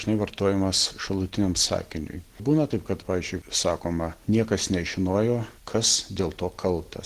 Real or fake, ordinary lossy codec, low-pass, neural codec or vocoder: real; Opus, 16 kbps; 14.4 kHz; none